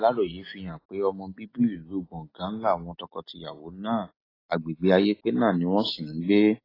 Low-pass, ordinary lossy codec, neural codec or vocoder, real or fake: 5.4 kHz; AAC, 24 kbps; none; real